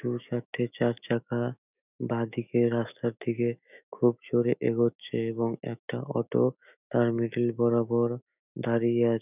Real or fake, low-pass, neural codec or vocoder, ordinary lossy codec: fake; 3.6 kHz; autoencoder, 48 kHz, 128 numbers a frame, DAC-VAE, trained on Japanese speech; none